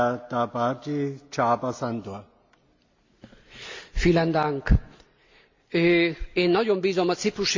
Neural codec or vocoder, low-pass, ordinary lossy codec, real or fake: none; 7.2 kHz; MP3, 48 kbps; real